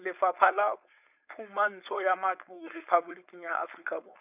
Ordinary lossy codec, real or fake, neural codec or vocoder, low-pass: none; fake; codec, 16 kHz, 4.8 kbps, FACodec; 3.6 kHz